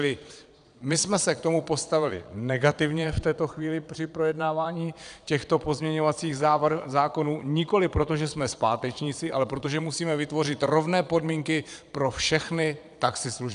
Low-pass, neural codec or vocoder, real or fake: 9.9 kHz; vocoder, 22.05 kHz, 80 mel bands, Vocos; fake